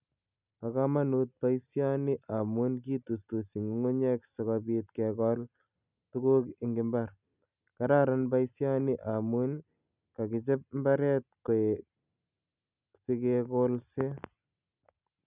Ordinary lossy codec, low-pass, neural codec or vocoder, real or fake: none; 3.6 kHz; none; real